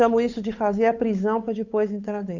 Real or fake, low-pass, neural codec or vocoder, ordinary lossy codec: fake; 7.2 kHz; codec, 16 kHz, 8 kbps, FunCodec, trained on Chinese and English, 25 frames a second; none